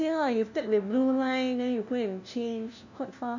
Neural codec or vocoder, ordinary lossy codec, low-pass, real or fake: codec, 16 kHz, 1 kbps, FunCodec, trained on LibriTTS, 50 frames a second; none; 7.2 kHz; fake